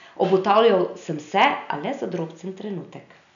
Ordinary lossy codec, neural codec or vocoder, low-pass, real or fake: none; none; 7.2 kHz; real